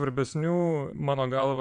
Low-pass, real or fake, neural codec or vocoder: 9.9 kHz; fake; vocoder, 22.05 kHz, 80 mel bands, Vocos